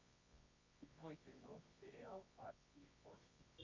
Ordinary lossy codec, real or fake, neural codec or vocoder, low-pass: MP3, 64 kbps; fake; codec, 24 kHz, 0.9 kbps, WavTokenizer, medium music audio release; 7.2 kHz